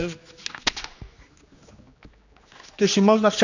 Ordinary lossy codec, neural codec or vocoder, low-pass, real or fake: none; codec, 16 kHz, 2 kbps, X-Codec, HuBERT features, trained on general audio; 7.2 kHz; fake